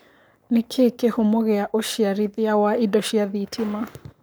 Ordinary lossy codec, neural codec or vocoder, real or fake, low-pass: none; codec, 44.1 kHz, 7.8 kbps, Pupu-Codec; fake; none